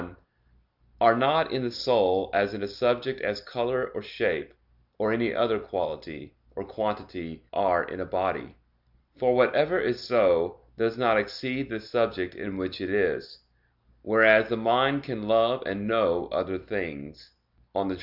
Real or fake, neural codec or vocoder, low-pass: real; none; 5.4 kHz